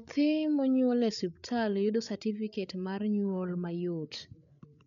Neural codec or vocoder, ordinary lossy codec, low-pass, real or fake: codec, 16 kHz, 8 kbps, FreqCodec, larger model; none; 7.2 kHz; fake